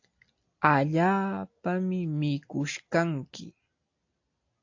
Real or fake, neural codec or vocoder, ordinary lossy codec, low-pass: real; none; AAC, 48 kbps; 7.2 kHz